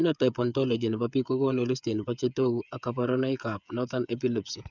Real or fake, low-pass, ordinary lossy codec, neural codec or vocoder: fake; 7.2 kHz; none; codec, 16 kHz, 8 kbps, FreqCodec, smaller model